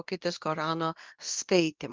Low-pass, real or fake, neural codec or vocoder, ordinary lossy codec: 7.2 kHz; fake; codec, 24 kHz, 0.9 kbps, WavTokenizer, medium speech release version 2; Opus, 24 kbps